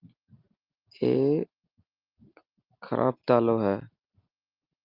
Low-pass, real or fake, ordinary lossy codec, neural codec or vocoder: 5.4 kHz; real; Opus, 24 kbps; none